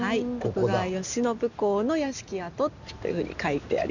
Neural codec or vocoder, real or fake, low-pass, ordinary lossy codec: none; real; 7.2 kHz; none